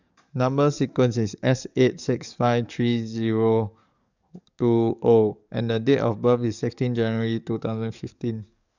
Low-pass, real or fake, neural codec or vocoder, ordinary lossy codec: 7.2 kHz; fake; codec, 44.1 kHz, 7.8 kbps, DAC; none